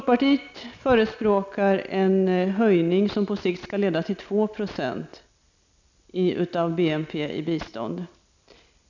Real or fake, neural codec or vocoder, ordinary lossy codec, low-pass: real; none; none; 7.2 kHz